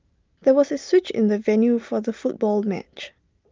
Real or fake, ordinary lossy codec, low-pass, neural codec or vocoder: real; Opus, 24 kbps; 7.2 kHz; none